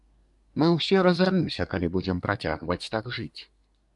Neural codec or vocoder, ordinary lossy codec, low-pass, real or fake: codec, 24 kHz, 1 kbps, SNAC; MP3, 96 kbps; 10.8 kHz; fake